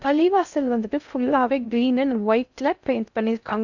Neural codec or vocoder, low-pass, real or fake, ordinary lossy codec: codec, 16 kHz in and 24 kHz out, 0.6 kbps, FocalCodec, streaming, 2048 codes; 7.2 kHz; fake; none